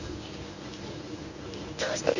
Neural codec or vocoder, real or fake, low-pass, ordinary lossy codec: codec, 24 kHz, 0.9 kbps, WavTokenizer, medium speech release version 1; fake; 7.2 kHz; none